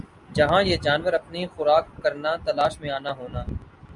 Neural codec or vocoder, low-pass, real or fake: none; 10.8 kHz; real